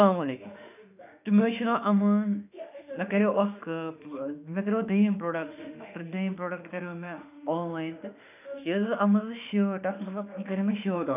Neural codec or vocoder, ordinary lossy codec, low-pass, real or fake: autoencoder, 48 kHz, 32 numbers a frame, DAC-VAE, trained on Japanese speech; none; 3.6 kHz; fake